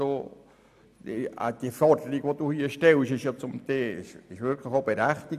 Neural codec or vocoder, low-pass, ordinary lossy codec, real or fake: none; 14.4 kHz; AAC, 96 kbps; real